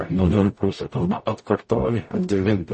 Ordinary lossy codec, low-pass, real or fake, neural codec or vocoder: MP3, 32 kbps; 10.8 kHz; fake; codec, 44.1 kHz, 0.9 kbps, DAC